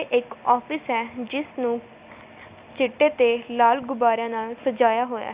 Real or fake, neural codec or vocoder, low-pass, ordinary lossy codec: real; none; 3.6 kHz; Opus, 64 kbps